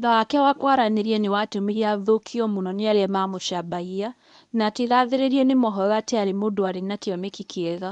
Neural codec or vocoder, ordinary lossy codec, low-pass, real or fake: codec, 24 kHz, 0.9 kbps, WavTokenizer, medium speech release version 1; none; 10.8 kHz; fake